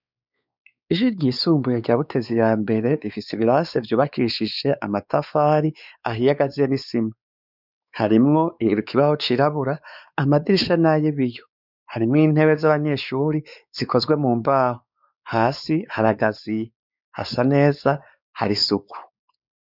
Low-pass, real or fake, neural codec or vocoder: 5.4 kHz; fake; codec, 16 kHz, 4 kbps, X-Codec, WavLM features, trained on Multilingual LibriSpeech